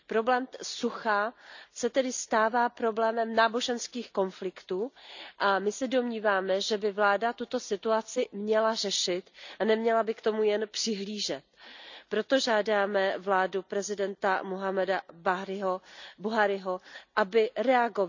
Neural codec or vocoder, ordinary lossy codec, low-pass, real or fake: none; none; 7.2 kHz; real